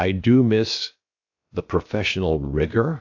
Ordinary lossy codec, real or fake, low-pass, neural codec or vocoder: AAC, 48 kbps; fake; 7.2 kHz; codec, 16 kHz, 0.7 kbps, FocalCodec